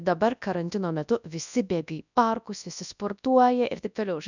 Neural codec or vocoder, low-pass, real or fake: codec, 24 kHz, 0.9 kbps, WavTokenizer, large speech release; 7.2 kHz; fake